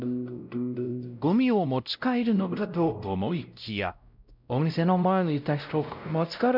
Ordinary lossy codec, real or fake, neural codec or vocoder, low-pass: none; fake; codec, 16 kHz, 0.5 kbps, X-Codec, WavLM features, trained on Multilingual LibriSpeech; 5.4 kHz